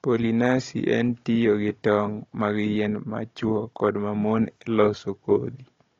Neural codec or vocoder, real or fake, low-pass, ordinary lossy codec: codec, 16 kHz, 16 kbps, FunCodec, trained on Chinese and English, 50 frames a second; fake; 7.2 kHz; AAC, 32 kbps